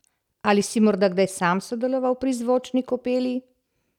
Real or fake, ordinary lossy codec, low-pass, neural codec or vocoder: real; none; 19.8 kHz; none